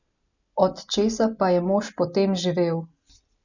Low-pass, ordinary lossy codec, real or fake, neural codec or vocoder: 7.2 kHz; none; real; none